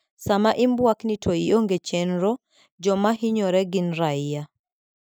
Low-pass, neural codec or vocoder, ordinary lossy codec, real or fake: none; none; none; real